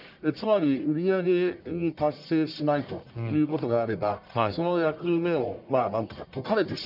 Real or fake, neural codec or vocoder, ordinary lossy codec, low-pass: fake; codec, 44.1 kHz, 1.7 kbps, Pupu-Codec; none; 5.4 kHz